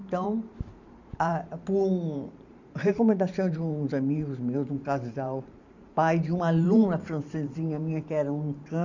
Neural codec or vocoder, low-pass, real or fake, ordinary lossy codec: vocoder, 44.1 kHz, 128 mel bands every 512 samples, BigVGAN v2; 7.2 kHz; fake; none